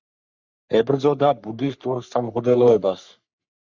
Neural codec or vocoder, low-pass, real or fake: codec, 44.1 kHz, 3.4 kbps, Pupu-Codec; 7.2 kHz; fake